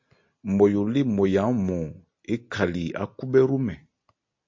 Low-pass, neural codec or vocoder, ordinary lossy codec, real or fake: 7.2 kHz; none; MP3, 48 kbps; real